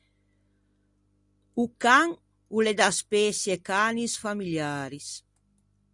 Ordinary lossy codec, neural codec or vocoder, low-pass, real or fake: Opus, 64 kbps; none; 10.8 kHz; real